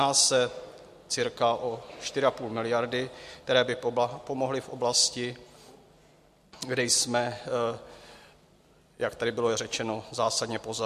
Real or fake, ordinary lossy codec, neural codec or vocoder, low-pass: real; MP3, 64 kbps; none; 14.4 kHz